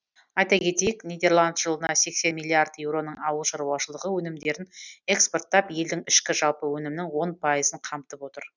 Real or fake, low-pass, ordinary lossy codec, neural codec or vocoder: real; 7.2 kHz; none; none